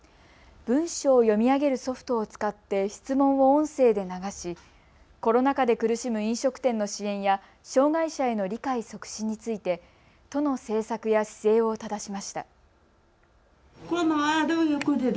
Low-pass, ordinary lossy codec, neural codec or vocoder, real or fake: none; none; none; real